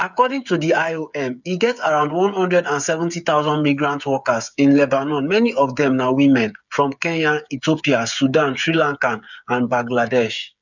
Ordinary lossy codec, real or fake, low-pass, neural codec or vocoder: none; fake; 7.2 kHz; codec, 16 kHz, 8 kbps, FreqCodec, smaller model